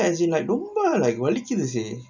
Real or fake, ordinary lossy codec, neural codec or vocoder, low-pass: real; none; none; 7.2 kHz